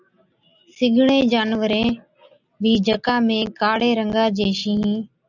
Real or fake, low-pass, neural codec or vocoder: real; 7.2 kHz; none